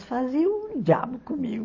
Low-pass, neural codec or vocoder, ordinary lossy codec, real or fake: 7.2 kHz; none; MP3, 32 kbps; real